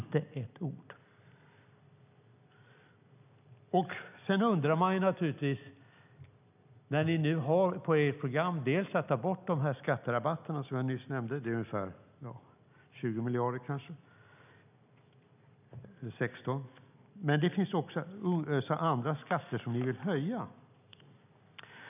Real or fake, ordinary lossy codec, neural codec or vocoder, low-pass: real; none; none; 3.6 kHz